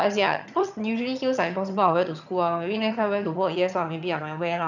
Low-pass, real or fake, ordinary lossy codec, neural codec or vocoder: 7.2 kHz; fake; none; vocoder, 22.05 kHz, 80 mel bands, HiFi-GAN